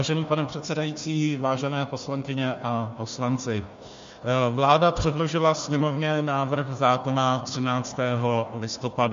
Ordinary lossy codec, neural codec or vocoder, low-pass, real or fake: MP3, 48 kbps; codec, 16 kHz, 1 kbps, FunCodec, trained on Chinese and English, 50 frames a second; 7.2 kHz; fake